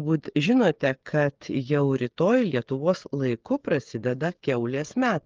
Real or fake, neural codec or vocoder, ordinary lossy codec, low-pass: fake; codec, 16 kHz, 8 kbps, FreqCodec, smaller model; Opus, 32 kbps; 7.2 kHz